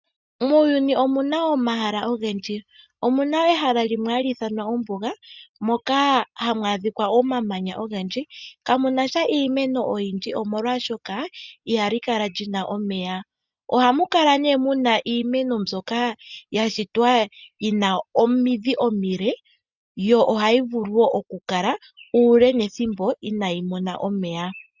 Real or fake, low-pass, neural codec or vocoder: real; 7.2 kHz; none